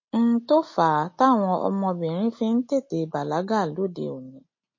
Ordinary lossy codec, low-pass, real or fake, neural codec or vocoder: MP3, 32 kbps; 7.2 kHz; real; none